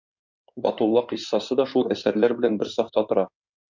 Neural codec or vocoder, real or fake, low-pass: codec, 16 kHz in and 24 kHz out, 2.2 kbps, FireRedTTS-2 codec; fake; 7.2 kHz